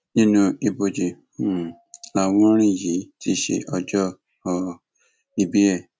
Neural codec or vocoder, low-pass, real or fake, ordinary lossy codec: none; none; real; none